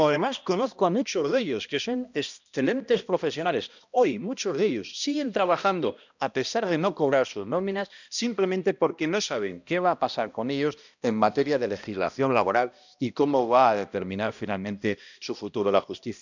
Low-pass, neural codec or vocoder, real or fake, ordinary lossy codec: 7.2 kHz; codec, 16 kHz, 1 kbps, X-Codec, HuBERT features, trained on balanced general audio; fake; none